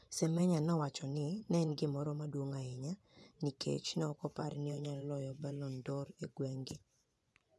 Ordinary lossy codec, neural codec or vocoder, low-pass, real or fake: none; vocoder, 24 kHz, 100 mel bands, Vocos; none; fake